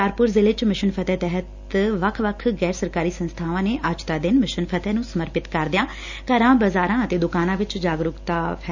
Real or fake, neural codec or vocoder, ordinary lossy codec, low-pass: real; none; none; 7.2 kHz